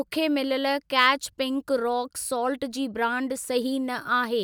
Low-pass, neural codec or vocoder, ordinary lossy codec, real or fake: none; none; none; real